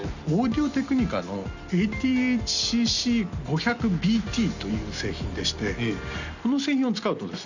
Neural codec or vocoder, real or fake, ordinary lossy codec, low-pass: none; real; none; 7.2 kHz